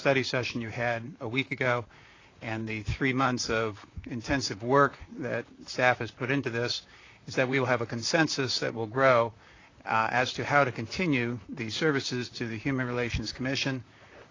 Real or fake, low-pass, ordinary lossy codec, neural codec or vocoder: fake; 7.2 kHz; AAC, 32 kbps; vocoder, 44.1 kHz, 128 mel bands, Pupu-Vocoder